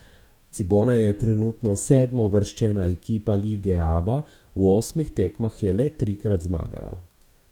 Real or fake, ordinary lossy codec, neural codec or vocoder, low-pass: fake; none; codec, 44.1 kHz, 2.6 kbps, DAC; 19.8 kHz